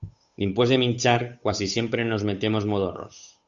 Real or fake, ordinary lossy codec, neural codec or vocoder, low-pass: fake; Opus, 64 kbps; codec, 16 kHz, 8 kbps, FunCodec, trained on Chinese and English, 25 frames a second; 7.2 kHz